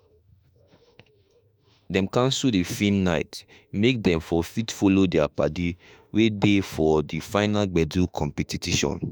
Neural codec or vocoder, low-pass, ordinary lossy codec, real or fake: autoencoder, 48 kHz, 32 numbers a frame, DAC-VAE, trained on Japanese speech; none; none; fake